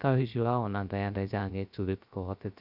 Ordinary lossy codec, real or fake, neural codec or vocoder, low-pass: none; fake; codec, 16 kHz, 0.3 kbps, FocalCodec; 5.4 kHz